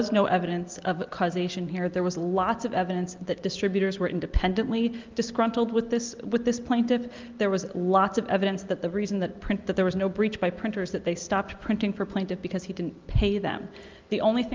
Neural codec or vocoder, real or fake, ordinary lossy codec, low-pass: none; real; Opus, 24 kbps; 7.2 kHz